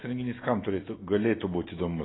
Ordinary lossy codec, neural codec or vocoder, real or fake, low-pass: AAC, 16 kbps; none; real; 7.2 kHz